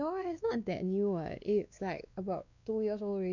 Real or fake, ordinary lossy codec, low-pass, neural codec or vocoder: fake; none; 7.2 kHz; codec, 16 kHz, 2 kbps, X-Codec, WavLM features, trained on Multilingual LibriSpeech